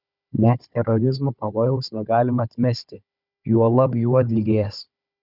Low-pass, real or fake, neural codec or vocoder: 5.4 kHz; fake; codec, 16 kHz, 4 kbps, FunCodec, trained on Chinese and English, 50 frames a second